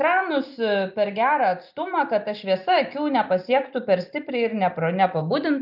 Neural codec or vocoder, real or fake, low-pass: none; real; 5.4 kHz